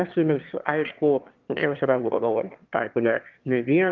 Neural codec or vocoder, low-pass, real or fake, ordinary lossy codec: autoencoder, 22.05 kHz, a latent of 192 numbers a frame, VITS, trained on one speaker; 7.2 kHz; fake; Opus, 32 kbps